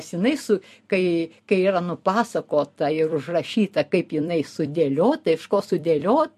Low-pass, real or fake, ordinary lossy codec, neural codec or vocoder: 14.4 kHz; fake; MP3, 64 kbps; vocoder, 44.1 kHz, 128 mel bands every 256 samples, BigVGAN v2